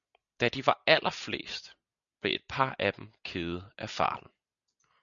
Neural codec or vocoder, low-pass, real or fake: none; 7.2 kHz; real